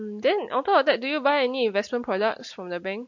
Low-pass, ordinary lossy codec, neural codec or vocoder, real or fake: 7.2 kHz; MP3, 48 kbps; none; real